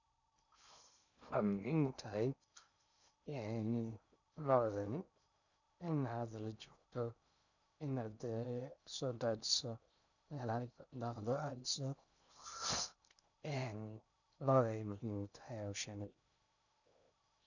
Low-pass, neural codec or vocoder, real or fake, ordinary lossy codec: 7.2 kHz; codec, 16 kHz in and 24 kHz out, 0.6 kbps, FocalCodec, streaming, 4096 codes; fake; none